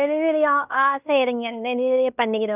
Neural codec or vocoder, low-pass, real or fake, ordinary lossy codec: codec, 16 kHz in and 24 kHz out, 0.9 kbps, LongCat-Audio-Codec, fine tuned four codebook decoder; 3.6 kHz; fake; none